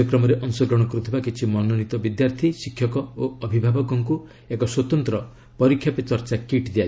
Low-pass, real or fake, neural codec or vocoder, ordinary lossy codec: none; real; none; none